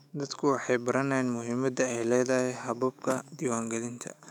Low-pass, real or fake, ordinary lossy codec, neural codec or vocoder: 19.8 kHz; fake; none; autoencoder, 48 kHz, 128 numbers a frame, DAC-VAE, trained on Japanese speech